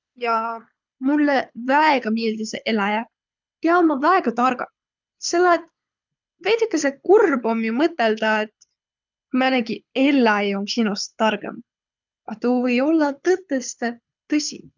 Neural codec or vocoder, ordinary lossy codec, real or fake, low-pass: codec, 24 kHz, 6 kbps, HILCodec; none; fake; 7.2 kHz